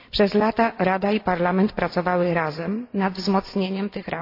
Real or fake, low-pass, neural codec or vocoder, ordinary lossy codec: real; 5.4 kHz; none; AAC, 32 kbps